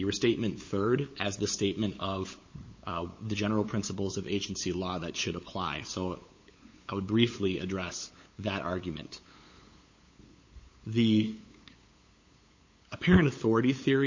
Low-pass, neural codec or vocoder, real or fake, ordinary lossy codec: 7.2 kHz; codec, 16 kHz, 8 kbps, FunCodec, trained on LibriTTS, 25 frames a second; fake; MP3, 32 kbps